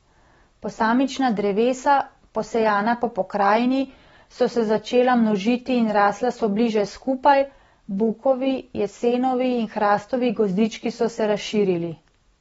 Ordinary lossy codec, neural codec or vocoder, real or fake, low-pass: AAC, 24 kbps; none; real; 9.9 kHz